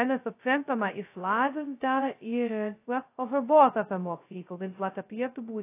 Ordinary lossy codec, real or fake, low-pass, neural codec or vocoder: AAC, 24 kbps; fake; 3.6 kHz; codec, 16 kHz, 0.2 kbps, FocalCodec